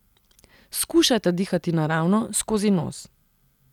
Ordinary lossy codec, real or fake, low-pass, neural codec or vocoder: none; real; 19.8 kHz; none